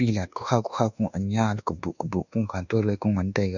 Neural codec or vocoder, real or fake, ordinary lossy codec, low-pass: codec, 24 kHz, 1.2 kbps, DualCodec; fake; none; 7.2 kHz